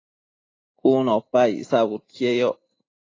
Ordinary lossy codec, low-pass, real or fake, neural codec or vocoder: AAC, 32 kbps; 7.2 kHz; real; none